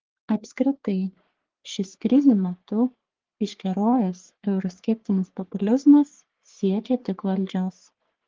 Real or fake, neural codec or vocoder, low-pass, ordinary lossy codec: fake; codec, 44.1 kHz, 3.4 kbps, Pupu-Codec; 7.2 kHz; Opus, 16 kbps